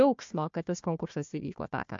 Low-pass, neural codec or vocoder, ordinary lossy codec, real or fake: 7.2 kHz; codec, 16 kHz, 1 kbps, FunCodec, trained on Chinese and English, 50 frames a second; MP3, 48 kbps; fake